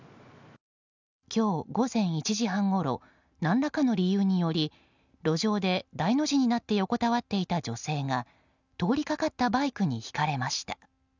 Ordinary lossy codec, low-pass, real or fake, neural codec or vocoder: none; 7.2 kHz; real; none